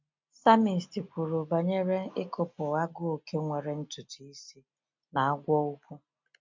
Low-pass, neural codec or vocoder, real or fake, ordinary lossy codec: 7.2 kHz; none; real; MP3, 64 kbps